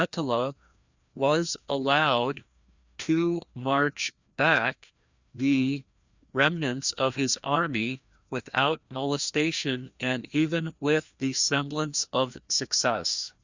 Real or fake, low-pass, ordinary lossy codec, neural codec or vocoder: fake; 7.2 kHz; Opus, 64 kbps; codec, 16 kHz, 1 kbps, FreqCodec, larger model